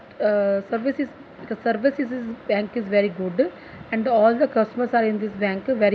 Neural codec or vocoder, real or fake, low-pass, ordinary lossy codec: none; real; none; none